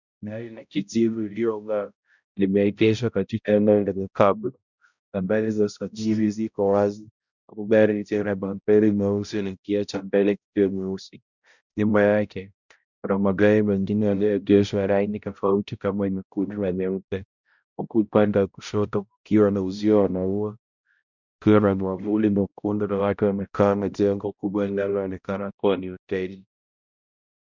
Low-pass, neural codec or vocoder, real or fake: 7.2 kHz; codec, 16 kHz, 0.5 kbps, X-Codec, HuBERT features, trained on balanced general audio; fake